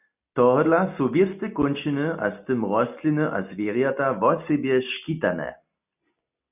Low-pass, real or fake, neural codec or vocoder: 3.6 kHz; real; none